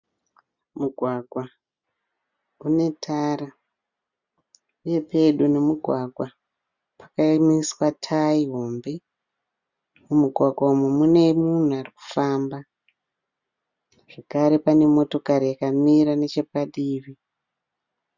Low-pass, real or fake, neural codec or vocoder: 7.2 kHz; real; none